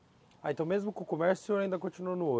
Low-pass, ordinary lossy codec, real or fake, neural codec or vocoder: none; none; real; none